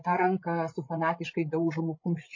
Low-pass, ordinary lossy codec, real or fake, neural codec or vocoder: 7.2 kHz; MP3, 32 kbps; fake; codec, 16 kHz, 16 kbps, FreqCodec, larger model